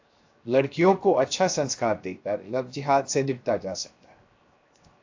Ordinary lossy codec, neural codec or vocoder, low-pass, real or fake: AAC, 48 kbps; codec, 16 kHz, 0.7 kbps, FocalCodec; 7.2 kHz; fake